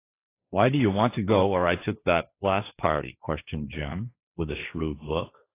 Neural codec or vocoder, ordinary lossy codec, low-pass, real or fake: codec, 16 kHz, 1.1 kbps, Voila-Tokenizer; AAC, 24 kbps; 3.6 kHz; fake